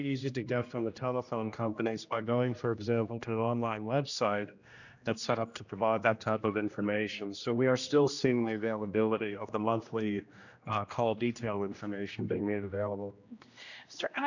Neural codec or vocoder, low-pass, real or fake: codec, 16 kHz, 1 kbps, X-Codec, HuBERT features, trained on general audio; 7.2 kHz; fake